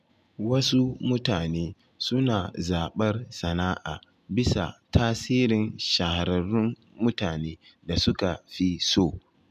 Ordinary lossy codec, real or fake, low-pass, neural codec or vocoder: none; real; 14.4 kHz; none